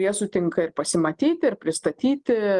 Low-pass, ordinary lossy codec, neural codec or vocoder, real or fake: 10.8 kHz; Opus, 24 kbps; none; real